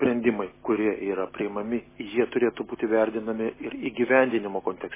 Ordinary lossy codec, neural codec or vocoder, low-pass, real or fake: MP3, 16 kbps; none; 3.6 kHz; real